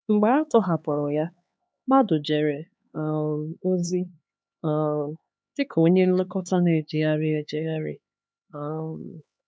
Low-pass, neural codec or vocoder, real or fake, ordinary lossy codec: none; codec, 16 kHz, 4 kbps, X-Codec, HuBERT features, trained on LibriSpeech; fake; none